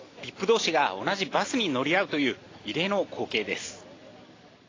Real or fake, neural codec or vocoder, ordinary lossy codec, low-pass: real; none; AAC, 32 kbps; 7.2 kHz